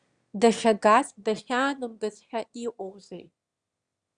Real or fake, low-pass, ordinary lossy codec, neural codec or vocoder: fake; 9.9 kHz; Opus, 64 kbps; autoencoder, 22.05 kHz, a latent of 192 numbers a frame, VITS, trained on one speaker